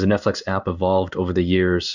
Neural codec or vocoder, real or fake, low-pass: codec, 16 kHz in and 24 kHz out, 1 kbps, XY-Tokenizer; fake; 7.2 kHz